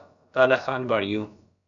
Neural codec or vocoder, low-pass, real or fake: codec, 16 kHz, about 1 kbps, DyCAST, with the encoder's durations; 7.2 kHz; fake